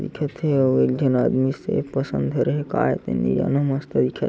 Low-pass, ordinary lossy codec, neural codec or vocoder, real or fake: none; none; none; real